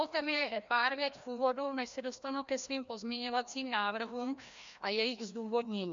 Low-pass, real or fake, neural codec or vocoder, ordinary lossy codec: 7.2 kHz; fake; codec, 16 kHz, 1 kbps, FreqCodec, larger model; MP3, 64 kbps